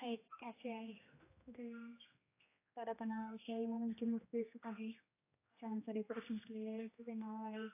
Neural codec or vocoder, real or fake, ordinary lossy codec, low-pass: codec, 16 kHz, 1 kbps, X-Codec, HuBERT features, trained on general audio; fake; AAC, 24 kbps; 3.6 kHz